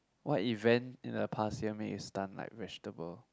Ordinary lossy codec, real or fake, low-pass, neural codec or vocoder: none; real; none; none